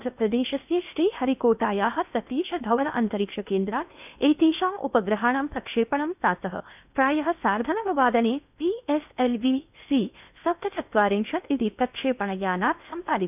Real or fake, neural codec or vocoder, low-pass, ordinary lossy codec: fake; codec, 16 kHz in and 24 kHz out, 0.8 kbps, FocalCodec, streaming, 65536 codes; 3.6 kHz; none